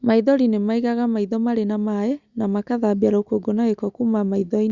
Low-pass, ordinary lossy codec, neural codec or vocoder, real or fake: 7.2 kHz; Opus, 64 kbps; none; real